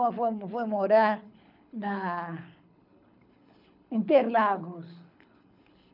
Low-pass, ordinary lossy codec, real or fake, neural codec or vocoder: 5.4 kHz; none; fake; codec, 24 kHz, 6 kbps, HILCodec